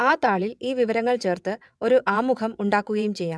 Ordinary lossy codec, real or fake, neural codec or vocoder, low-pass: none; fake; vocoder, 22.05 kHz, 80 mel bands, WaveNeXt; none